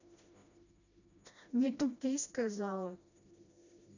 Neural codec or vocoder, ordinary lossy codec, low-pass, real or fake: codec, 16 kHz, 1 kbps, FreqCodec, smaller model; none; 7.2 kHz; fake